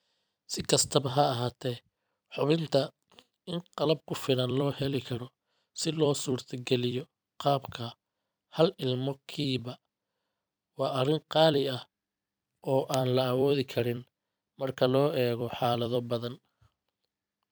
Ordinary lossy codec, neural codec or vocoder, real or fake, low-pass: none; vocoder, 44.1 kHz, 128 mel bands every 256 samples, BigVGAN v2; fake; none